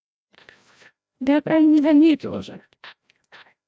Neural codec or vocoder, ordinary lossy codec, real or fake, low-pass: codec, 16 kHz, 0.5 kbps, FreqCodec, larger model; none; fake; none